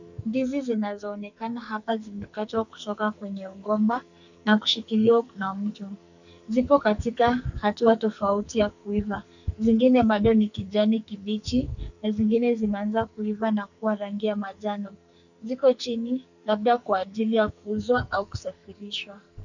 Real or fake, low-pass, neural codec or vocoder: fake; 7.2 kHz; codec, 44.1 kHz, 2.6 kbps, SNAC